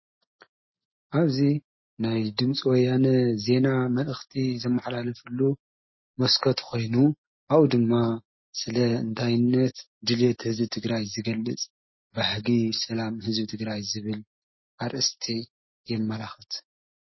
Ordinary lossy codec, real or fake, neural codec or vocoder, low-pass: MP3, 24 kbps; real; none; 7.2 kHz